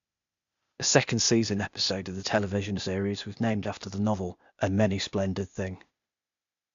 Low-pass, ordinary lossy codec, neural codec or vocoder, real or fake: 7.2 kHz; MP3, 64 kbps; codec, 16 kHz, 0.8 kbps, ZipCodec; fake